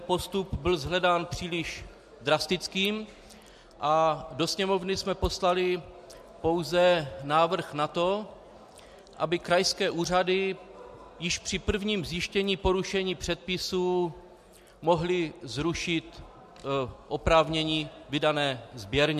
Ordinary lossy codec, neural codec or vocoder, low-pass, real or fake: MP3, 64 kbps; none; 14.4 kHz; real